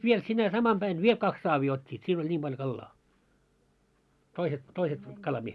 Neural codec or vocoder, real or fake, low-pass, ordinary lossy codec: none; real; none; none